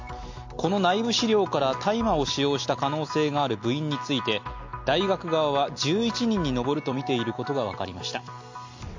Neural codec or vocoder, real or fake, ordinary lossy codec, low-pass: none; real; MP3, 48 kbps; 7.2 kHz